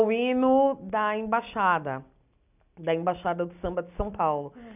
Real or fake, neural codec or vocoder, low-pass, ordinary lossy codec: real; none; 3.6 kHz; none